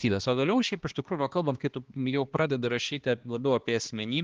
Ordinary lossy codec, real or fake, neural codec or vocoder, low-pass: Opus, 16 kbps; fake; codec, 16 kHz, 2 kbps, X-Codec, HuBERT features, trained on balanced general audio; 7.2 kHz